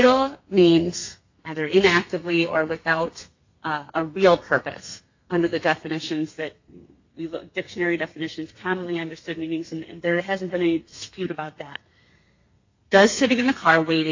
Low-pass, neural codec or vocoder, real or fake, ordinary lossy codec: 7.2 kHz; codec, 32 kHz, 1.9 kbps, SNAC; fake; AAC, 48 kbps